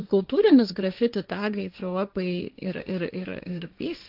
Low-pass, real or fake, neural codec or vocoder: 5.4 kHz; fake; codec, 16 kHz, 1.1 kbps, Voila-Tokenizer